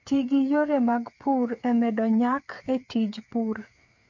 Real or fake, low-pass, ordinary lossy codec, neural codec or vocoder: fake; 7.2 kHz; MP3, 48 kbps; codec, 16 kHz, 8 kbps, FreqCodec, smaller model